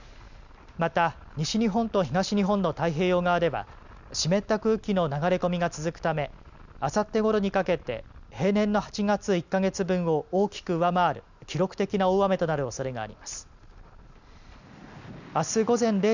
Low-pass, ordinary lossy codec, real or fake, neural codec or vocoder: 7.2 kHz; none; real; none